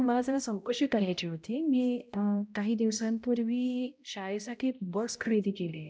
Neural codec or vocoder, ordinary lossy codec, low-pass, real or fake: codec, 16 kHz, 0.5 kbps, X-Codec, HuBERT features, trained on balanced general audio; none; none; fake